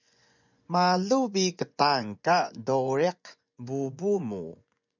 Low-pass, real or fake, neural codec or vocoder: 7.2 kHz; fake; vocoder, 24 kHz, 100 mel bands, Vocos